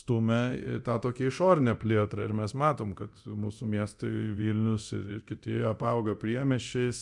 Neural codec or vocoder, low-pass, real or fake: codec, 24 kHz, 0.9 kbps, DualCodec; 10.8 kHz; fake